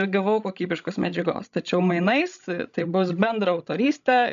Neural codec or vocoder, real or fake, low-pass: codec, 16 kHz, 16 kbps, FreqCodec, larger model; fake; 7.2 kHz